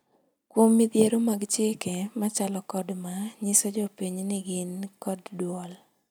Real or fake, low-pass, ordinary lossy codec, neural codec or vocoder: real; none; none; none